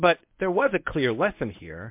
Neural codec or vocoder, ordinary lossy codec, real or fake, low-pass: codec, 24 kHz, 0.9 kbps, WavTokenizer, medium speech release version 1; MP3, 32 kbps; fake; 3.6 kHz